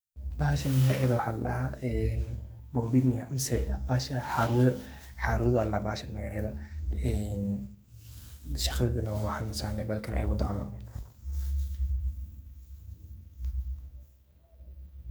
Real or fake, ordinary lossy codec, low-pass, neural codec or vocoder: fake; none; none; codec, 44.1 kHz, 2.6 kbps, SNAC